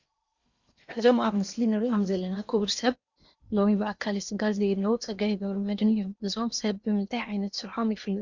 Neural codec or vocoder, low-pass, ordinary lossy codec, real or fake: codec, 16 kHz in and 24 kHz out, 0.8 kbps, FocalCodec, streaming, 65536 codes; 7.2 kHz; Opus, 64 kbps; fake